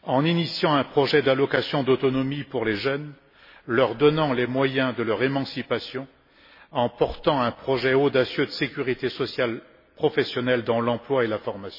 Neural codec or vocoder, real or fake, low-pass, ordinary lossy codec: none; real; 5.4 kHz; MP3, 24 kbps